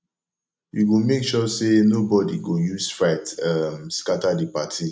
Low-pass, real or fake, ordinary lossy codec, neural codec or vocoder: none; real; none; none